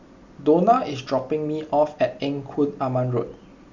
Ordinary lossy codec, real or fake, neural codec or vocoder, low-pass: Opus, 64 kbps; real; none; 7.2 kHz